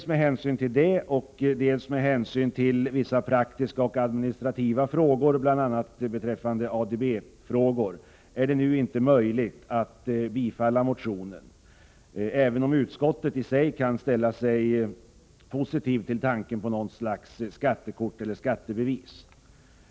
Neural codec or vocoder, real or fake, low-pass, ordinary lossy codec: none; real; none; none